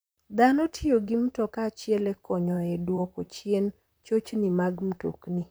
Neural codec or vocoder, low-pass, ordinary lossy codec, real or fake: vocoder, 44.1 kHz, 128 mel bands, Pupu-Vocoder; none; none; fake